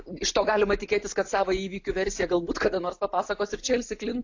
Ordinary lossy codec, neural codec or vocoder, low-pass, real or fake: AAC, 48 kbps; none; 7.2 kHz; real